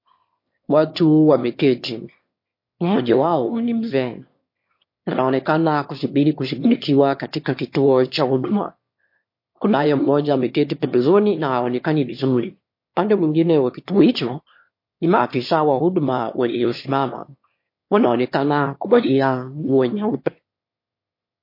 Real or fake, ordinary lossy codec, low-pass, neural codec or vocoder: fake; MP3, 32 kbps; 5.4 kHz; autoencoder, 22.05 kHz, a latent of 192 numbers a frame, VITS, trained on one speaker